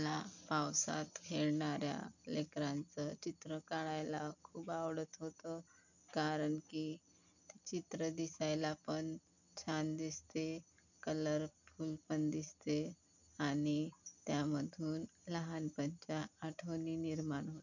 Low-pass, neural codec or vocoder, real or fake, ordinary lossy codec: 7.2 kHz; none; real; none